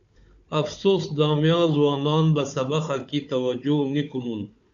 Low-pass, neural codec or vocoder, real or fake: 7.2 kHz; codec, 16 kHz, 4 kbps, FunCodec, trained on Chinese and English, 50 frames a second; fake